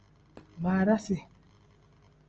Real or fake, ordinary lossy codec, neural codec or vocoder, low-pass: real; Opus, 24 kbps; none; 7.2 kHz